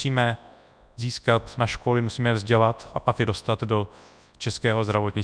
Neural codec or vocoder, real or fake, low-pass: codec, 24 kHz, 0.9 kbps, WavTokenizer, large speech release; fake; 9.9 kHz